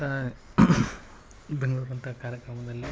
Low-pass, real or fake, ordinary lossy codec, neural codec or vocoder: none; real; none; none